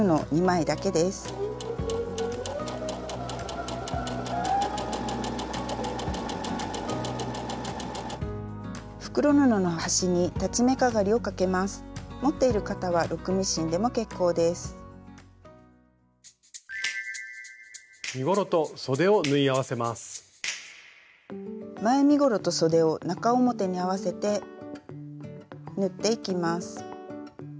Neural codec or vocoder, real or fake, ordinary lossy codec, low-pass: none; real; none; none